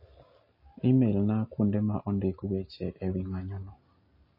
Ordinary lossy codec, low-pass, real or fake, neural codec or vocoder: MP3, 24 kbps; 5.4 kHz; real; none